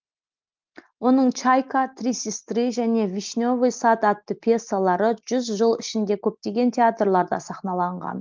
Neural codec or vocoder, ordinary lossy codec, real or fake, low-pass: none; Opus, 32 kbps; real; 7.2 kHz